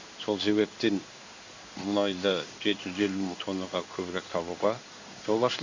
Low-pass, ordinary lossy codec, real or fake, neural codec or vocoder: 7.2 kHz; MP3, 64 kbps; fake; codec, 16 kHz in and 24 kHz out, 1 kbps, XY-Tokenizer